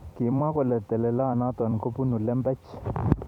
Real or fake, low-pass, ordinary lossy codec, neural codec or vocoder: fake; 19.8 kHz; none; vocoder, 44.1 kHz, 128 mel bands every 256 samples, BigVGAN v2